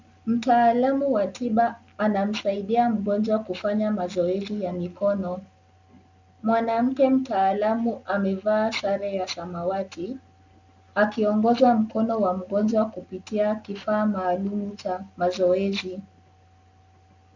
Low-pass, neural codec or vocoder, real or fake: 7.2 kHz; none; real